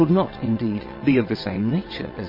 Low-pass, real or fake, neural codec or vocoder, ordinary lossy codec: 5.4 kHz; fake; vocoder, 22.05 kHz, 80 mel bands, WaveNeXt; MP3, 24 kbps